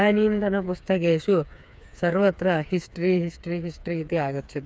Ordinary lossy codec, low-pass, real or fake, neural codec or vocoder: none; none; fake; codec, 16 kHz, 4 kbps, FreqCodec, smaller model